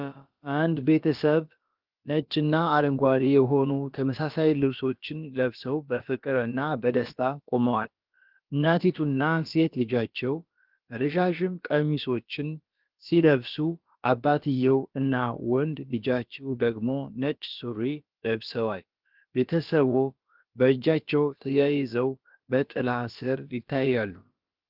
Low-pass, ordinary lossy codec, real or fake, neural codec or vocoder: 5.4 kHz; Opus, 32 kbps; fake; codec, 16 kHz, about 1 kbps, DyCAST, with the encoder's durations